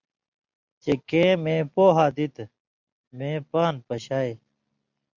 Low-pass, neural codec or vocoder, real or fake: 7.2 kHz; none; real